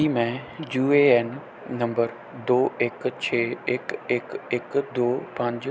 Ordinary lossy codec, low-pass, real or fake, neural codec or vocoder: none; none; real; none